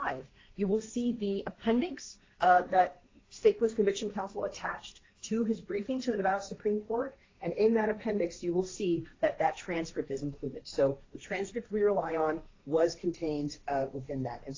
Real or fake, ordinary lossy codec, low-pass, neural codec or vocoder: fake; AAC, 32 kbps; 7.2 kHz; codec, 16 kHz, 1.1 kbps, Voila-Tokenizer